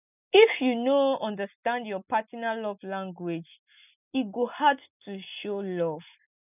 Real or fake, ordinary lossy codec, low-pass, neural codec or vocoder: real; none; 3.6 kHz; none